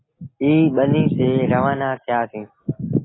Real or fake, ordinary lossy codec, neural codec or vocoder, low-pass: real; AAC, 16 kbps; none; 7.2 kHz